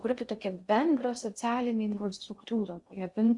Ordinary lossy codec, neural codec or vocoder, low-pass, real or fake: Opus, 64 kbps; codec, 16 kHz in and 24 kHz out, 0.6 kbps, FocalCodec, streaming, 2048 codes; 10.8 kHz; fake